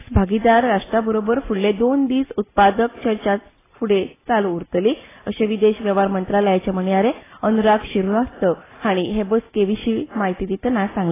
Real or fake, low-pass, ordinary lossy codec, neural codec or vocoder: real; 3.6 kHz; AAC, 16 kbps; none